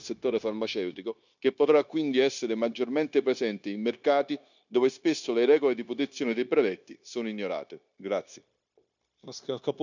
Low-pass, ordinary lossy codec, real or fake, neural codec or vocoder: 7.2 kHz; none; fake; codec, 16 kHz, 0.9 kbps, LongCat-Audio-Codec